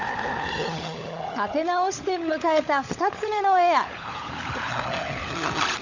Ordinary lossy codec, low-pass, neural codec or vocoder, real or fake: none; 7.2 kHz; codec, 16 kHz, 16 kbps, FunCodec, trained on LibriTTS, 50 frames a second; fake